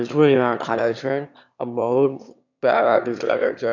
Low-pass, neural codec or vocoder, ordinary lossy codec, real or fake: 7.2 kHz; autoencoder, 22.05 kHz, a latent of 192 numbers a frame, VITS, trained on one speaker; none; fake